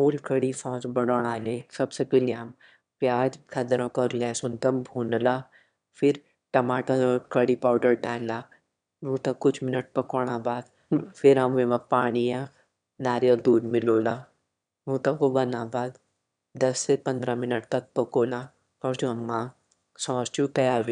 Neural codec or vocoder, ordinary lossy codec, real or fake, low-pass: autoencoder, 22.05 kHz, a latent of 192 numbers a frame, VITS, trained on one speaker; none; fake; 9.9 kHz